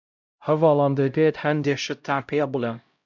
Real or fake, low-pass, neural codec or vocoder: fake; 7.2 kHz; codec, 16 kHz, 0.5 kbps, X-Codec, HuBERT features, trained on LibriSpeech